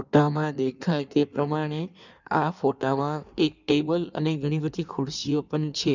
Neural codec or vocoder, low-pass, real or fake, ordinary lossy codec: codec, 16 kHz in and 24 kHz out, 1.1 kbps, FireRedTTS-2 codec; 7.2 kHz; fake; none